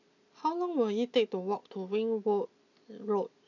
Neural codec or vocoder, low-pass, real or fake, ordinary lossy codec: none; 7.2 kHz; real; none